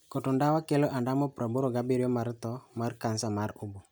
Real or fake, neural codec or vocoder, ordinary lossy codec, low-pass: real; none; none; none